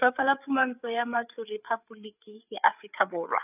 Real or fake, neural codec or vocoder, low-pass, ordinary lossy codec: fake; codec, 16 kHz, 8 kbps, FreqCodec, larger model; 3.6 kHz; none